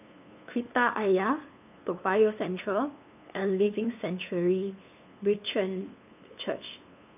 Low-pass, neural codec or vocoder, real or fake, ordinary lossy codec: 3.6 kHz; codec, 16 kHz, 2 kbps, FunCodec, trained on LibriTTS, 25 frames a second; fake; none